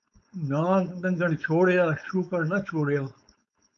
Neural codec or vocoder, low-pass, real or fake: codec, 16 kHz, 4.8 kbps, FACodec; 7.2 kHz; fake